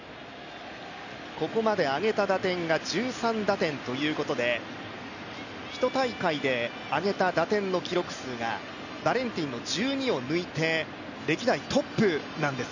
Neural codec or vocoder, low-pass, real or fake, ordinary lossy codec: none; 7.2 kHz; real; none